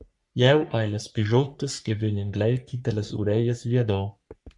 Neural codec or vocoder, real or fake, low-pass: codec, 44.1 kHz, 3.4 kbps, Pupu-Codec; fake; 10.8 kHz